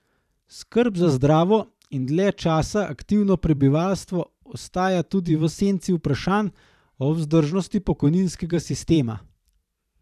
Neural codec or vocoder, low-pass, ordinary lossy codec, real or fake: vocoder, 44.1 kHz, 128 mel bands every 512 samples, BigVGAN v2; 14.4 kHz; none; fake